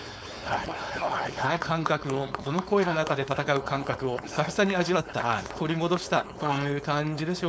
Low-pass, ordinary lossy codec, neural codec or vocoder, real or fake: none; none; codec, 16 kHz, 4.8 kbps, FACodec; fake